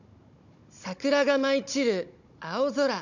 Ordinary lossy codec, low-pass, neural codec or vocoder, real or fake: none; 7.2 kHz; codec, 16 kHz, 16 kbps, FunCodec, trained on Chinese and English, 50 frames a second; fake